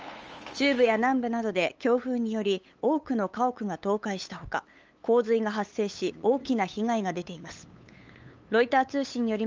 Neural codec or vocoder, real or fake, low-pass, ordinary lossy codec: codec, 16 kHz, 8 kbps, FunCodec, trained on LibriTTS, 25 frames a second; fake; 7.2 kHz; Opus, 24 kbps